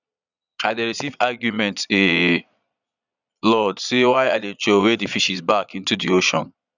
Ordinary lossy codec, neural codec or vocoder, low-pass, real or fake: none; vocoder, 22.05 kHz, 80 mel bands, Vocos; 7.2 kHz; fake